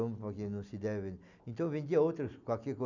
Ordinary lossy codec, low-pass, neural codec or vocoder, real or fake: none; 7.2 kHz; none; real